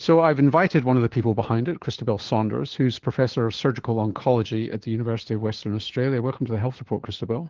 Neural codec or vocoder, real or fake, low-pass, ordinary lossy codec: codec, 16 kHz, 4 kbps, FunCodec, trained on LibriTTS, 50 frames a second; fake; 7.2 kHz; Opus, 16 kbps